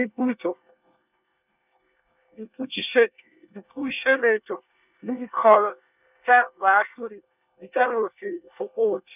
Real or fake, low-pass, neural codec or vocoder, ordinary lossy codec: fake; 3.6 kHz; codec, 24 kHz, 1 kbps, SNAC; none